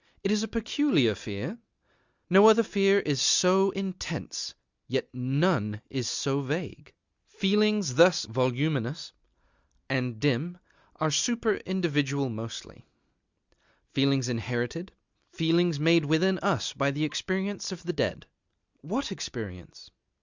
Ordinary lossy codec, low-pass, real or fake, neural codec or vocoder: Opus, 64 kbps; 7.2 kHz; real; none